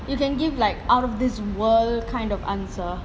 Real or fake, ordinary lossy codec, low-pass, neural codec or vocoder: real; none; none; none